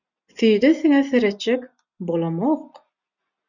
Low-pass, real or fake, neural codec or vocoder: 7.2 kHz; real; none